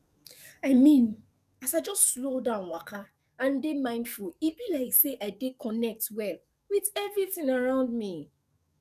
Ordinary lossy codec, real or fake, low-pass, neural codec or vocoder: none; fake; 14.4 kHz; codec, 44.1 kHz, 7.8 kbps, DAC